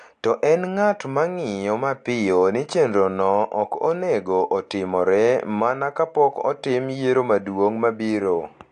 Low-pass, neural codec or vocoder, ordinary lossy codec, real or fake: 9.9 kHz; none; AAC, 64 kbps; real